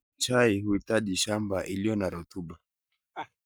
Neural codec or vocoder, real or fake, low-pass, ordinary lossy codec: codec, 44.1 kHz, 7.8 kbps, Pupu-Codec; fake; none; none